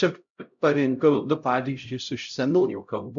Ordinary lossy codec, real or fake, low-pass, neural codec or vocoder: MP3, 64 kbps; fake; 7.2 kHz; codec, 16 kHz, 0.5 kbps, X-Codec, HuBERT features, trained on LibriSpeech